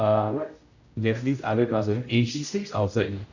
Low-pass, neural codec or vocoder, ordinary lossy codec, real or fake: 7.2 kHz; codec, 16 kHz, 0.5 kbps, X-Codec, HuBERT features, trained on general audio; none; fake